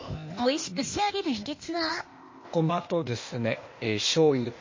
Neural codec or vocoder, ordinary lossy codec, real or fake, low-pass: codec, 16 kHz, 0.8 kbps, ZipCodec; MP3, 32 kbps; fake; 7.2 kHz